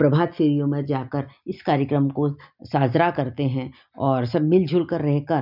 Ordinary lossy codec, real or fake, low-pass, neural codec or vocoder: none; real; 5.4 kHz; none